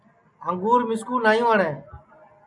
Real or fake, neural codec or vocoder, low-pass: real; none; 10.8 kHz